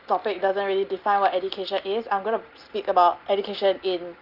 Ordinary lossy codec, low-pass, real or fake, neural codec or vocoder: Opus, 32 kbps; 5.4 kHz; real; none